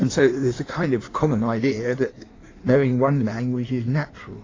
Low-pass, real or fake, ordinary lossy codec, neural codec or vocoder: 7.2 kHz; fake; AAC, 32 kbps; codec, 16 kHz in and 24 kHz out, 1.1 kbps, FireRedTTS-2 codec